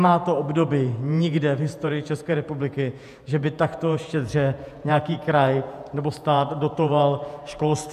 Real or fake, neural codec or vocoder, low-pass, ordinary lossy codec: fake; vocoder, 48 kHz, 128 mel bands, Vocos; 14.4 kHz; AAC, 96 kbps